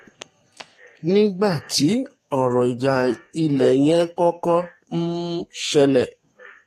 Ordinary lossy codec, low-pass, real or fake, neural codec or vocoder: AAC, 48 kbps; 14.4 kHz; fake; codec, 32 kHz, 1.9 kbps, SNAC